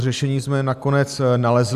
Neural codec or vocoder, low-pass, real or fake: none; 14.4 kHz; real